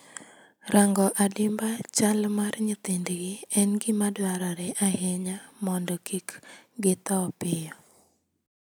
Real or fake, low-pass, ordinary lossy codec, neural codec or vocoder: real; none; none; none